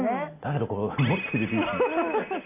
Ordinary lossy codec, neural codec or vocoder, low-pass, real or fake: AAC, 24 kbps; none; 3.6 kHz; real